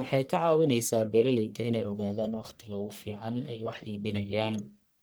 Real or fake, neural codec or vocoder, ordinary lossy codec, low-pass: fake; codec, 44.1 kHz, 1.7 kbps, Pupu-Codec; none; none